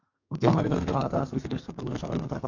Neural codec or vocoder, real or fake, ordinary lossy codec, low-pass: codec, 32 kHz, 1.9 kbps, SNAC; fake; AAC, 48 kbps; 7.2 kHz